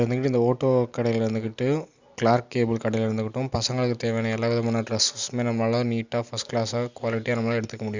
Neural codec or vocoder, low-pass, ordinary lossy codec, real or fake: none; 7.2 kHz; Opus, 64 kbps; real